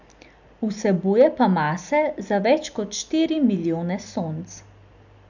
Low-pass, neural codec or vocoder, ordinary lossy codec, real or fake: 7.2 kHz; none; none; real